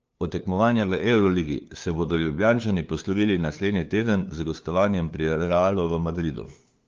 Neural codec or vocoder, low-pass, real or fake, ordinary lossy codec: codec, 16 kHz, 2 kbps, FunCodec, trained on LibriTTS, 25 frames a second; 7.2 kHz; fake; Opus, 24 kbps